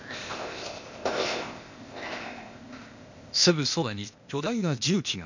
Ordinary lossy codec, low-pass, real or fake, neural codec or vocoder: none; 7.2 kHz; fake; codec, 16 kHz, 0.8 kbps, ZipCodec